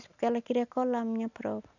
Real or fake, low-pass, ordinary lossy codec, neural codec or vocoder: real; 7.2 kHz; none; none